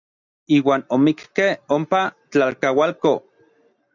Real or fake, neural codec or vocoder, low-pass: real; none; 7.2 kHz